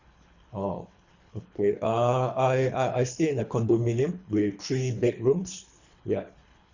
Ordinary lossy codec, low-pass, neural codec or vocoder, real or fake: Opus, 64 kbps; 7.2 kHz; codec, 24 kHz, 3 kbps, HILCodec; fake